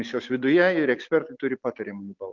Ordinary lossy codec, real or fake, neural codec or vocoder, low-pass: MP3, 64 kbps; real; none; 7.2 kHz